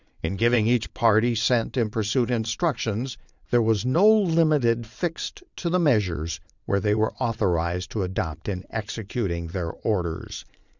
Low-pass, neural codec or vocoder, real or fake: 7.2 kHz; vocoder, 22.05 kHz, 80 mel bands, Vocos; fake